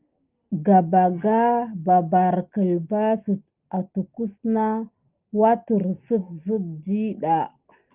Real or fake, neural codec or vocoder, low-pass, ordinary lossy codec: real; none; 3.6 kHz; Opus, 32 kbps